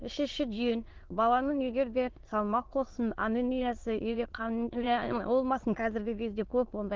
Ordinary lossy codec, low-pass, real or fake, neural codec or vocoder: Opus, 16 kbps; 7.2 kHz; fake; autoencoder, 22.05 kHz, a latent of 192 numbers a frame, VITS, trained on many speakers